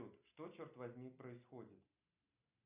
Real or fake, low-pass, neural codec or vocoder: real; 3.6 kHz; none